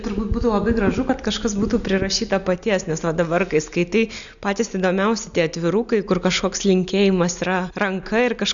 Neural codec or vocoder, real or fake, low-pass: none; real; 7.2 kHz